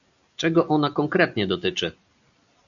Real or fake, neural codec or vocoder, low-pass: real; none; 7.2 kHz